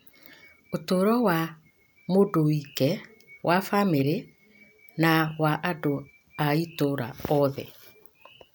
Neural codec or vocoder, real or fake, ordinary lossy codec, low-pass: none; real; none; none